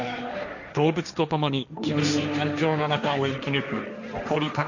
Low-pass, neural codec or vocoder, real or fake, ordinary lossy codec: 7.2 kHz; codec, 16 kHz, 1.1 kbps, Voila-Tokenizer; fake; none